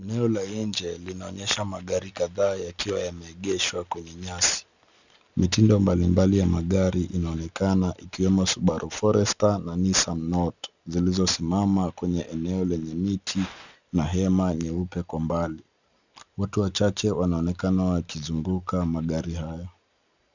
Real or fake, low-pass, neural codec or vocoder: real; 7.2 kHz; none